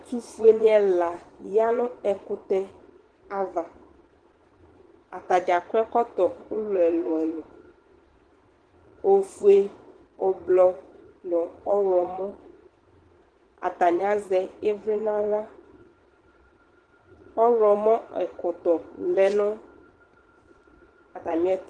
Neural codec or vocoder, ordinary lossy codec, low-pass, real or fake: vocoder, 22.05 kHz, 80 mel bands, Vocos; Opus, 16 kbps; 9.9 kHz; fake